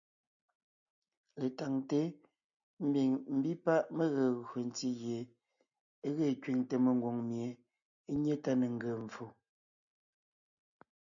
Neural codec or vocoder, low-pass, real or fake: none; 7.2 kHz; real